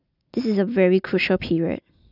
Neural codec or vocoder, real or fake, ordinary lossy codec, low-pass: none; real; none; 5.4 kHz